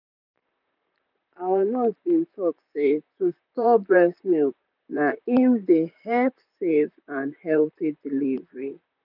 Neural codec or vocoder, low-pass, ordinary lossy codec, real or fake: vocoder, 44.1 kHz, 128 mel bands, Pupu-Vocoder; 5.4 kHz; none; fake